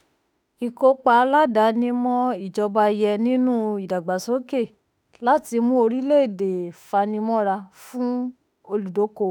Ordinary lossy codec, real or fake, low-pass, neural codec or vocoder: none; fake; none; autoencoder, 48 kHz, 32 numbers a frame, DAC-VAE, trained on Japanese speech